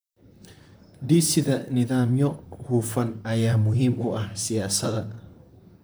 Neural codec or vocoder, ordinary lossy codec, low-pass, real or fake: vocoder, 44.1 kHz, 128 mel bands, Pupu-Vocoder; none; none; fake